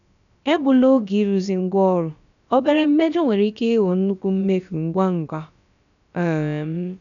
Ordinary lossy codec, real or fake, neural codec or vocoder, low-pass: none; fake; codec, 16 kHz, about 1 kbps, DyCAST, with the encoder's durations; 7.2 kHz